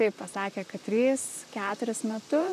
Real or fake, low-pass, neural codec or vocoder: fake; 14.4 kHz; vocoder, 44.1 kHz, 128 mel bands, Pupu-Vocoder